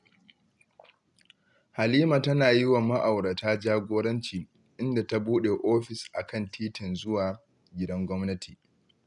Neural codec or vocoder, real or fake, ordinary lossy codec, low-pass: none; real; none; 10.8 kHz